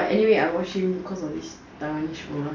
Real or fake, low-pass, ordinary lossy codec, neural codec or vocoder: real; 7.2 kHz; MP3, 64 kbps; none